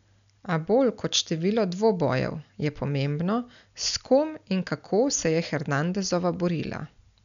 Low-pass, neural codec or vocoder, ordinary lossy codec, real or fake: 7.2 kHz; none; none; real